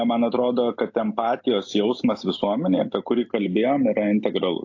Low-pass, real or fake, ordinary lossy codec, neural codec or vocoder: 7.2 kHz; real; AAC, 48 kbps; none